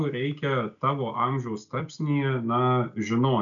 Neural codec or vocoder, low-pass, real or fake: none; 7.2 kHz; real